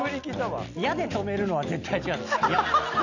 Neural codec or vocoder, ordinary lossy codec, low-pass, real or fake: none; none; 7.2 kHz; real